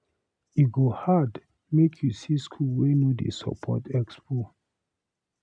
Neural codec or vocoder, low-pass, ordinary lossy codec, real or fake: none; 9.9 kHz; none; real